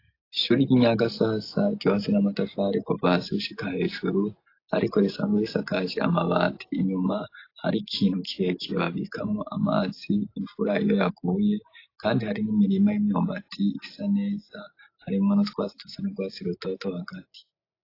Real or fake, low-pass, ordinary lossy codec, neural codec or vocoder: real; 5.4 kHz; AAC, 32 kbps; none